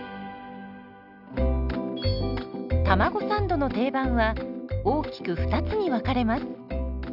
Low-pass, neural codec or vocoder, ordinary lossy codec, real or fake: 5.4 kHz; none; none; real